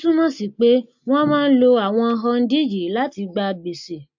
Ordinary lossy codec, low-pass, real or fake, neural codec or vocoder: MP3, 48 kbps; 7.2 kHz; real; none